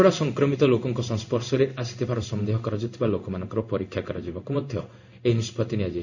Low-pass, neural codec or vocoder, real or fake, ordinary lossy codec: 7.2 kHz; codec, 16 kHz in and 24 kHz out, 1 kbps, XY-Tokenizer; fake; none